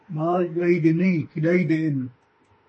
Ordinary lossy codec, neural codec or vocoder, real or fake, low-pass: MP3, 32 kbps; autoencoder, 48 kHz, 32 numbers a frame, DAC-VAE, trained on Japanese speech; fake; 10.8 kHz